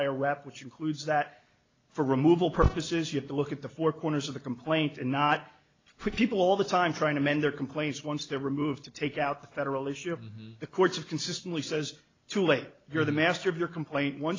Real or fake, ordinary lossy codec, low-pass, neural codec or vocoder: real; AAC, 32 kbps; 7.2 kHz; none